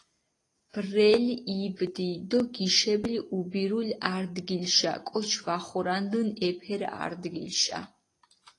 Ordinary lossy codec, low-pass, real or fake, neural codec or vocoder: AAC, 32 kbps; 10.8 kHz; real; none